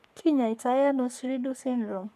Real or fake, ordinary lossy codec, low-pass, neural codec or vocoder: fake; none; 14.4 kHz; codec, 44.1 kHz, 3.4 kbps, Pupu-Codec